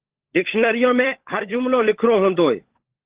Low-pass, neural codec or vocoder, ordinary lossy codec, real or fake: 3.6 kHz; codec, 16 kHz, 16 kbps, FunCodec, trained on LibriTTS, 50 frames a second; Opus, 16 kbps; fake